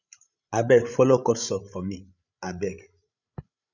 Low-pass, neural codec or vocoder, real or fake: 7.2 kHz; codec, 16 kHz, 16 kbps, FreqCodec, larger model; fake